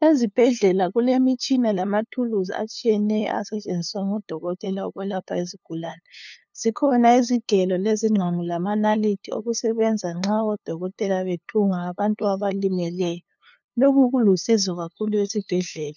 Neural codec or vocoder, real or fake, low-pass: codec, 16 kHz, 2 kbps, FunCodec, trained on LibriTTS, 25 frames a second; fake; 7.2 kHz